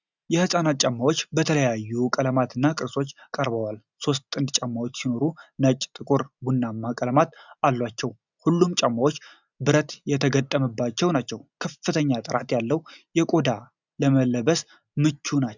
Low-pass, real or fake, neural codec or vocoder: 7.2 kHz; real; none